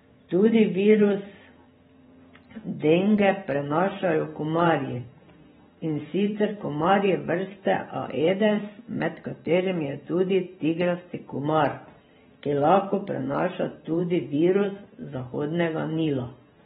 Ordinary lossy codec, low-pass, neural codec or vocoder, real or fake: AAC, 16 kbps; 19.8 kHz; vocoder, 44.1 kHz, 128 mel bands every 512 samples, BigVGAN v2; fake